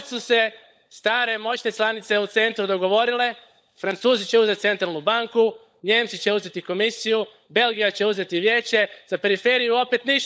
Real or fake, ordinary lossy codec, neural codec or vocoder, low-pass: fake; none; codec, 16 kHz, 16 kbps, FunCodec, trained on LibriTTS, 50 frames a second; none